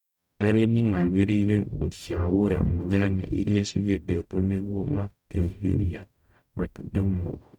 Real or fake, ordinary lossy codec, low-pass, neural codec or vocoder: fake; none; 19.8 kHz; codec, 44.1 kHz, 0.9 kbps, DAC